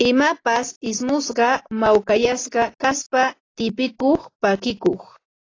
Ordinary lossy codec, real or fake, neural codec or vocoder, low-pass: AAC, 32 kbps; real; none; 7.2 kHz